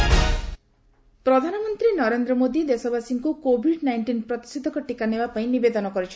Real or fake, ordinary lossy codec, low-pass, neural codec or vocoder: real; none; none; none